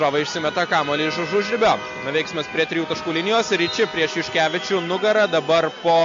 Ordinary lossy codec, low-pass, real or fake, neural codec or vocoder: MP3, 48 kbps; 7.2 kHz; real; none